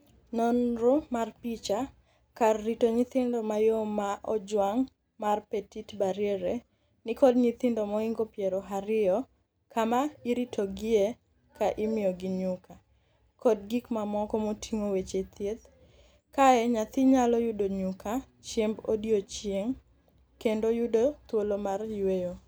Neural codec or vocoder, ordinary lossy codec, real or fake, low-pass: none; none; real; none